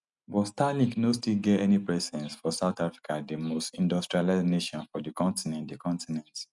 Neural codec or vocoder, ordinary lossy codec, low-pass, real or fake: none; none; 10.8 kHz; real